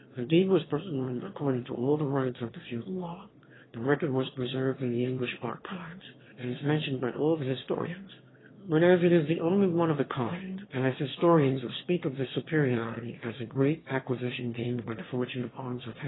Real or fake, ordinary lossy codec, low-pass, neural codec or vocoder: fake; AAC, 16 kbps; 7.2 kHz; autoencoder, 22.05 kHz, a latent of 192 numbers a frame, VITS, trained on one speaker